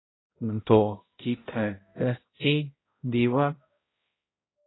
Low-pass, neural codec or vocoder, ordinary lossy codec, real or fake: 7.2 kHz; codec, 16 kHz, 0.5 kbps, X-Codec, HuBERT features, trained on balanced general audio; AAC, 16 kbps; fake